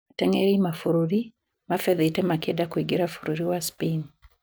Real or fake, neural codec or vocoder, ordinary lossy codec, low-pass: real; none; none; none